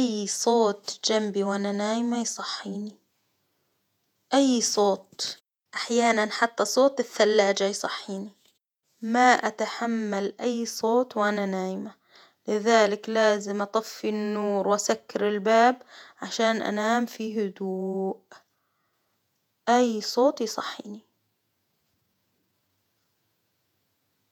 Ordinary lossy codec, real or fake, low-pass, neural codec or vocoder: none; fake; 19.8 kHz; vocoder, 48 kHz, 128 mel bands, Vocos